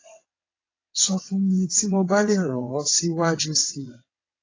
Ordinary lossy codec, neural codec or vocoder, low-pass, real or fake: AAC, 32 kbps; vocoder, 22.05 kHz, 80 mel bands, WaveNeXt; 7.2 kHz; fake